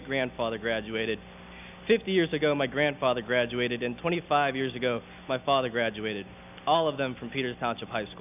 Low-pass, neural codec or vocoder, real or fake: 3.6 kHz; none; real